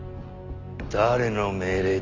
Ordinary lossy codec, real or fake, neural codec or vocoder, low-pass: MP3, 64 kbps; real; none; 7.2 kHz